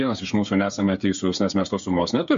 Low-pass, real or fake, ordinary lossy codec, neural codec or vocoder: 7.2 kHz; fake; MP3, 48 kbps; codec, 16 kHz, 8 kbps, FreqCodec, smaller model